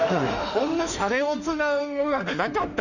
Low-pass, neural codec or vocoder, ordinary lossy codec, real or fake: 7.2 kHz; autoencoder, 48 kHz, 32 numbers a frame, DAC-VAE, trained on Japanese speech; none; fake